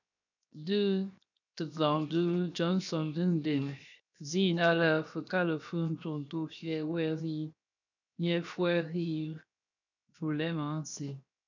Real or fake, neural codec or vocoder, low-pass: fake; codec, 16 kHz, 0.7 kbps, FocalCodec; 7.2 kHz